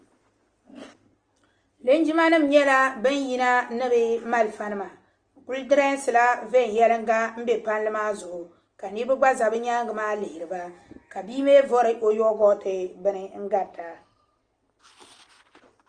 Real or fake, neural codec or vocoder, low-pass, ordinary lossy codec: real; none; 9.9 kHz; Opus, 24 kbps